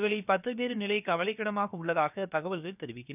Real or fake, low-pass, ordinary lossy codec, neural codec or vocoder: fake; 3.6 kHz; none; codec, 16 kHz, about 1 kbps, DyCAST, with the encoder's durations